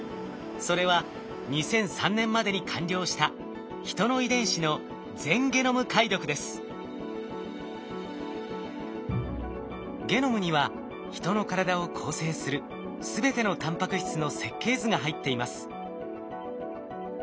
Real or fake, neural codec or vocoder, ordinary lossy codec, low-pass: real; none; none; none